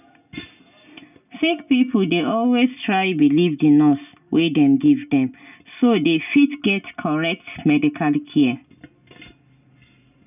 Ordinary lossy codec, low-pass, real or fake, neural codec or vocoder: none; 3.6 kHz; real; none